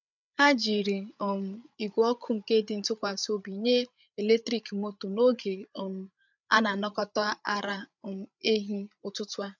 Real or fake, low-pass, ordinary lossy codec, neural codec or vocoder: fake; 7.2 kHz; none; codec, 16 kHz, 8 kbps, FreqCodec, larger model